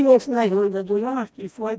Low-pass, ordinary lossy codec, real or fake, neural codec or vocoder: none; none; fake; codec, 16 kHz, 1 kbps, FreqCodec, smaller model